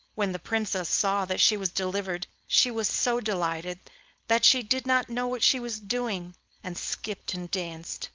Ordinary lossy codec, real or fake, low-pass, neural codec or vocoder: Opus, 24 kbps; fake; 7.2 kHz; codec, 16 kHz, 4.8 kbps, FACodec